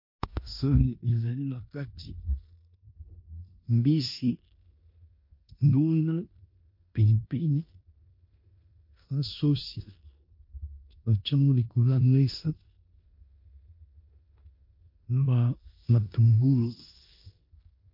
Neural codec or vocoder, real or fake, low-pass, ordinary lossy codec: codec, 16 kHz in and 24 kHz out, 0.9 kbps, LongCat-Audio-Codec, four codebook decoder; fake; 5.4 kHz; MP3, 32 kbps